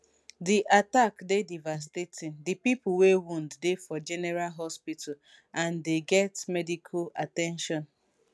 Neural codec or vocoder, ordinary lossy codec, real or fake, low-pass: none; none; real; none